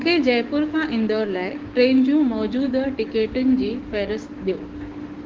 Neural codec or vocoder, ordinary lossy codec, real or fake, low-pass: codec, 16 kHz, 6 kbps, DAC; Opus, 16 kbps; fake; 7.2 kHz